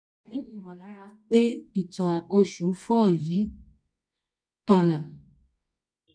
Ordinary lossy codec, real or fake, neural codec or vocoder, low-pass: none; fake; codec, 24 kHz, 0.9 kbps, WavTokenizer, medium music audio release; 9.9 kHz